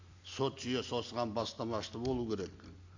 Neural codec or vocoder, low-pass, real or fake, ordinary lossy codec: none; 7.2 kHz; real; AAC, 48 kbps